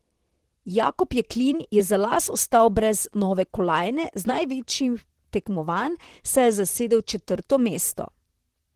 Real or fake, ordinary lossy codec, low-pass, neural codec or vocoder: fake; Opus, 16 kbps; 14.4 kHz; vocoder, 44.1 kHz, 128 mel bands, Pupu-Vocoder